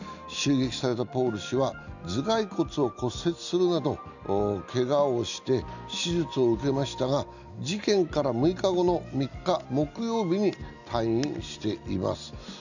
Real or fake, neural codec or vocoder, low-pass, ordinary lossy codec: real; none; 7.2 kHz; none